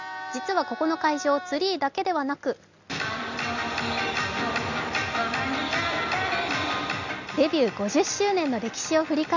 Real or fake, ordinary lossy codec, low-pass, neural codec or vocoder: real; none; 7.2 kHz; none